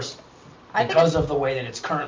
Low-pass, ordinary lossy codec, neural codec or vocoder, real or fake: 7.2 kHz; Opus, 24 kbps; none; real